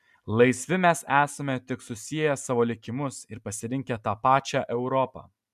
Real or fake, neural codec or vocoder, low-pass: real; none; 14.4 kHz